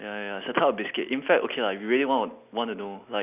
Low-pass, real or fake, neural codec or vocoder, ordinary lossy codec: 3.6 kHz; real; none; none